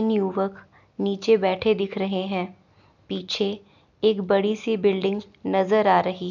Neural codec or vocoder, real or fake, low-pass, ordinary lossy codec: none; real; 7.2 kHz; none